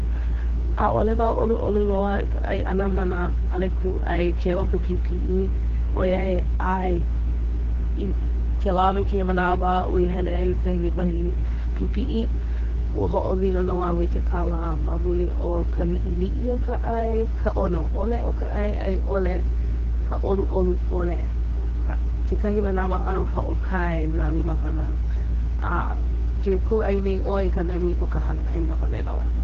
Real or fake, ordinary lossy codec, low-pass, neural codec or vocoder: fake; Opus, 16 kbps; 7.2 kHz; codec, 16 kHz, 2 kbps, FreqCodec, larger model